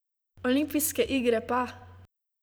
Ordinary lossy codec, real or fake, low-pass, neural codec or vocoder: none; fake; none; vocoder, 44.1 kHz, 128 mel bands every 512 samples, BigVGAN v2